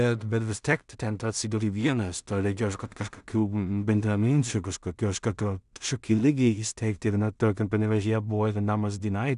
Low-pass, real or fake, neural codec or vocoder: 10.8 kHz; fake; codec, 16 kHz in and 24 kHz out, 0.4 kbps, LongCat-Audio-Codec, two codebook decoder